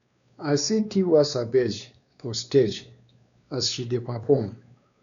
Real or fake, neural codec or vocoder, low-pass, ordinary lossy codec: fake; codec, 16 kHz, 2 kbps, X-Codec, WavLM features, trained on Multilingual LibriSpeech; 7.2 kHz; none